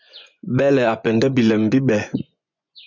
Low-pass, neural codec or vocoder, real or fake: 7.2 kHz; vocoder, 24 kHz, 100 mel bands, Vocos; fake